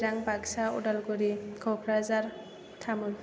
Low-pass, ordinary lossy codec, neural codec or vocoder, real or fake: none; none; none; real